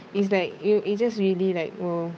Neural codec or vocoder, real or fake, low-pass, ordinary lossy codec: codec, 16 kHz, 8 kbps, FunCodec, trained on Chinese and English, 25 frames a second; fake; none; none